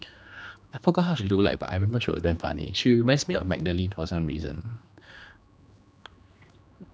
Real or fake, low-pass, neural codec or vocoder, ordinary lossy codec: fake; none; codec, 16 kHz, 2 kbps, X-Codec, HuBERT features, trained on general audio; none